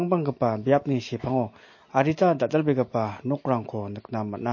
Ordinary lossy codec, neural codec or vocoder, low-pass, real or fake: MP3, 32 kbps; none; 7.2 kHz; real